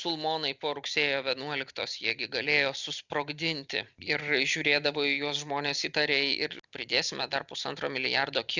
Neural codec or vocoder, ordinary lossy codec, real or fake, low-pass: none; Opus, 64 kbps; real; 7.2 kHz